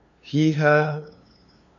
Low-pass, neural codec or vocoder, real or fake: 7.2 kHz; codec, 16 kHz, 2 kbps, FunCodec, trained on LibriTTS, 25 frames a second; fake